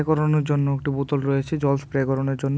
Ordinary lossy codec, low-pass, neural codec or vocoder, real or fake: none; none; none; real